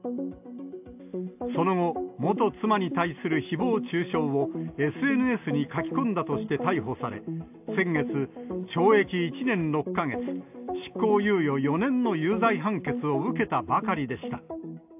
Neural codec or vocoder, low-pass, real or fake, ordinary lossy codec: none; 3.6 kHz; real; AAC, 32 kbps